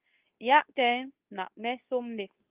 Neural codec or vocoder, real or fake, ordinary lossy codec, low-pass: codec, 24 kHz, 0.9 kbps, WavTokenizer, medium speech release version 2; fake; Opus, 32 kbps; 3.6 kHz